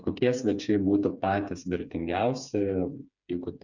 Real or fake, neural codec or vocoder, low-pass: fake; codec, 16 kHz, 4 kbps, FreqCodec, smaller model; 7.2 kHz